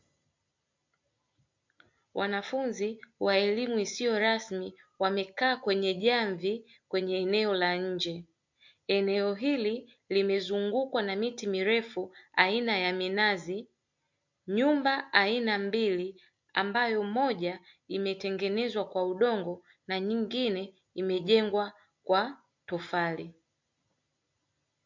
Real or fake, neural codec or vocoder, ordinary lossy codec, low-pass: real; none; MP3, 48 kbps; 7.2 kHz